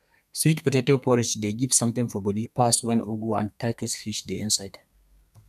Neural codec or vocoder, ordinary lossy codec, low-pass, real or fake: codec, 32 kHz, 1.9 kbps, SNAC; none; 14.4 kHz; fake